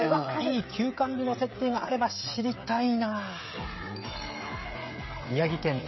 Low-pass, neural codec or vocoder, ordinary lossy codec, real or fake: 7.2 kHz; codec, 16 kHz, 16 kbps, FreqCodec, smaller model; MP3, 24 kbps; fake